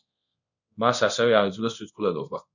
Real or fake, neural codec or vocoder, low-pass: fake; codec, 24 kHz, 0.5 kbps, DualCodec; 7.2 kHz